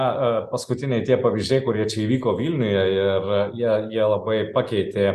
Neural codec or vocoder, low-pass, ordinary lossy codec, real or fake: none; 14.4 kHz; Opus, 64 kbps; real